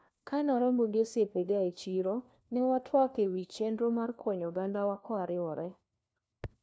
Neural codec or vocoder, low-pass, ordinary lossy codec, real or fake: codec, 16 kHz, 1 kbps, FunCodec, trained on LibriTTS, 50 frames a second; none; none; fake